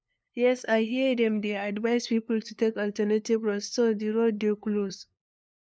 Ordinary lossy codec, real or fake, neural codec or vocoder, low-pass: none; fake; codec, 16 kHz, 2 kbps, FunCodec, trained on LibriTTS, 25 frames a second; none